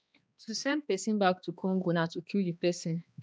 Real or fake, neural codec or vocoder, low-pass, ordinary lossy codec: fake; codec, 16 kHz, 2 kbps, X-Codec, HuBERT features, trained on balanced general audio; none; none